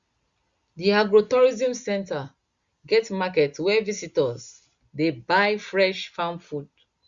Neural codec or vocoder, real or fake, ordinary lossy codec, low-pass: none; real; Opus, 64 kbps; 7.2 kHz